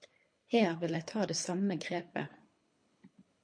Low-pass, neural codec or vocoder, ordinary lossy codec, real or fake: 9.9 kHz; codec, 24 kHz, 3 kbps, HILCodec; MP3, 48 kbps; fake